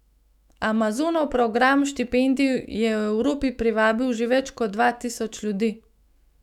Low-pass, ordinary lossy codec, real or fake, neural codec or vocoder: 19.8 kHz; none; fake; autoencoder, 48 kHz, 128 numbers a frame, DAC-VAE, trained on Japanese speech